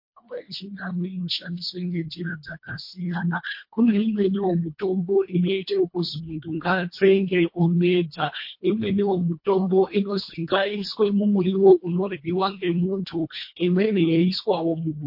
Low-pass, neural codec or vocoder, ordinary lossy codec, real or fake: 5.4 kHz; codec, 24 kHz, 1.5 kbps, HILCodec; MP3, 32 kbps; fake